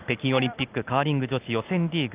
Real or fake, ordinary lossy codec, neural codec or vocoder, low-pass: real; Opus, 32 kbps; none; 3.6 kHz